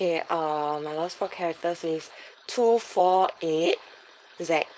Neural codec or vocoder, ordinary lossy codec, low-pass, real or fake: codec, 16 kHz, 4.8 kbps, FACodec; none; none; fake